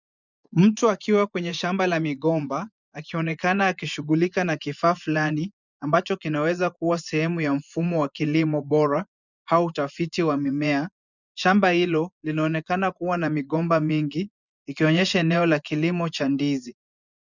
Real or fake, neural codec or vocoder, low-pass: fake; vocoder, 24 kHz, 100 mel bands, Vocos; 7.2 kHz